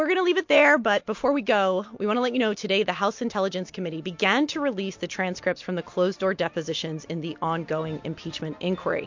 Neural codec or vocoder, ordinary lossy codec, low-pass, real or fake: none; MP3, 48 kbps; 7.2 kHz; real